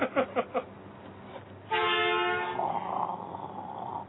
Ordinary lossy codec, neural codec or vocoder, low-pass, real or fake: AAC, 16 kbps; none; 7.2 kHz; real